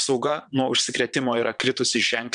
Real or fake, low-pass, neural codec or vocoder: fake; 9.9 kHz; vocoder, 22.05 kHz, 80 mel bands, WaveNeXt